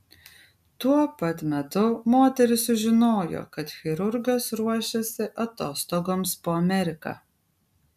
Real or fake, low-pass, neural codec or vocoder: real; 14.4 kHz; none